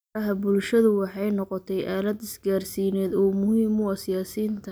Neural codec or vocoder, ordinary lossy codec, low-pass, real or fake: none; none; none; real